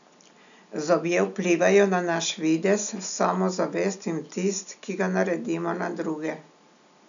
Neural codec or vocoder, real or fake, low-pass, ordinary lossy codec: none; real; 7.2 kHz; none